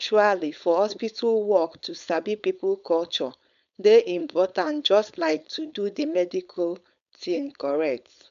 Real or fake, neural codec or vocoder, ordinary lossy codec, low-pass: fake; codec, 16 kHz, 4.8 kbps, FACodec; none; 7.2 kHz